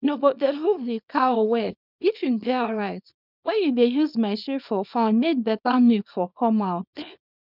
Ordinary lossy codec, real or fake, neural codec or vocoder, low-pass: none; fake; codec, 24 kHz, 0.9 kbps, WavTokenizer, small release; 5.4 kHz